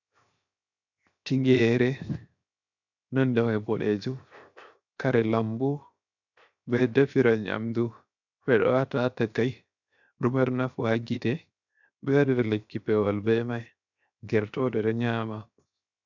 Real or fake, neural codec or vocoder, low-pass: fake; codec, 16 kHz, 0.7 kbps, FocalCodec; 7.2 kHz